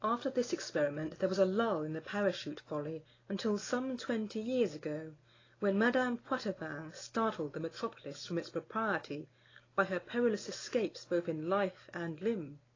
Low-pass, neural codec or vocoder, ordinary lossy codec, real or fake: 7.2 kHz; none; AAC, 32 kbps; real